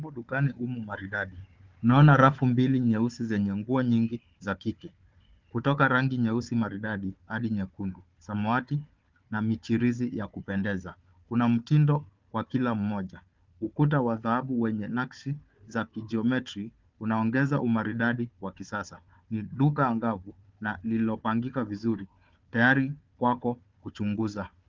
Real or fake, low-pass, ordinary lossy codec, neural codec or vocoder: fake; 7.2 kHz; Opus, 24 kbps; codec, 16 kHz, 4 kbps, FunCodec, trained on Chinese and English, 50 frames a second